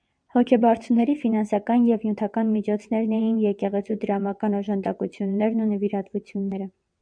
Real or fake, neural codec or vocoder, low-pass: fake; vocoder, 22.05 kHz, 80 mel bands, WaveNeXt; 9.9 kHz